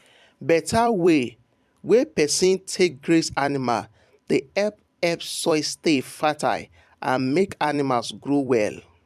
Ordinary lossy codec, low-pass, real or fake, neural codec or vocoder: MP3, 96 kbps; 14.4 kHz; fake; vocoder, 44.1 kHz, 128 mel bands every 256 samples, BigVGAN v2